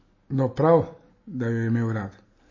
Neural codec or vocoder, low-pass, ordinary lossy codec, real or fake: none; 7.2 kHz; MP3, 32 kbps; real